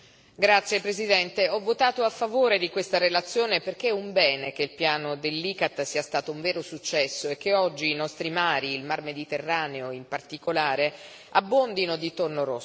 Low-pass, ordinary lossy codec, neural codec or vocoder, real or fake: none; none; none; real